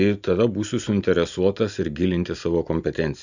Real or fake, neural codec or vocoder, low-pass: real; none; 7.2 kHz